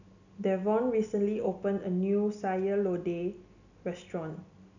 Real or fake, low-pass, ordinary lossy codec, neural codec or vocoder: real; 7.2 kHz; none; none